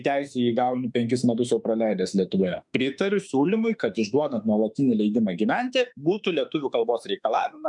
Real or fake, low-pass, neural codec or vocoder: fake; 10.8 kHz; autoencoder, 48 kHz, 32 numbers a frame, DAC-VAE, trained on Japanese speech